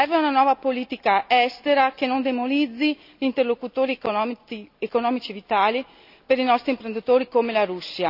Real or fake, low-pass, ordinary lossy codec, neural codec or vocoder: real; 5.4 kHz; none; none